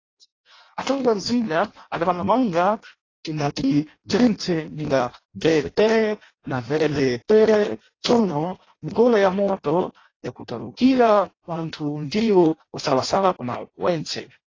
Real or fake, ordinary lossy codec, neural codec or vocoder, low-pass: fake; AAC, 32 kbps; codec, 16 kHz in and 24 kHz out, 0.6 kbps, FireRedTTS-2 codec; 7.2 kHz